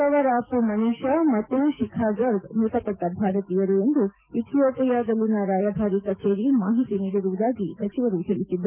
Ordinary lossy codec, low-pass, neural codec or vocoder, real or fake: none; 3.6 kHz; codec, 44.1 kHz, 7.8 kbps, DAC; fake